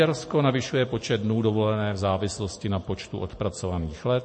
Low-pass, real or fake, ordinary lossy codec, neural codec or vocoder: 9.9 kHz; real; MP3, 32 kbps; none